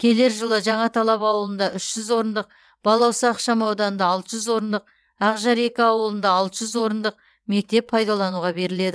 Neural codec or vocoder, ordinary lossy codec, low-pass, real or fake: vocoder, 22.05 kHz, 80 mel bands, WaveNeXt; none; none; fake